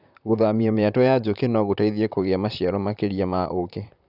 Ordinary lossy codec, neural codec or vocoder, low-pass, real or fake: none; vocoder, 44.1 kHz, 128 mel bands, Pupu-Vocoder; 5.4 kHz; fake